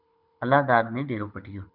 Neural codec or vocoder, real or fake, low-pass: codec, 24 kHz, 6 kbps, HILCodec; fake; 5.4 kHz